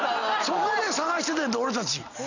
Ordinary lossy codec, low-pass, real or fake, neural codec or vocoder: none; 7.2 kHz; real; none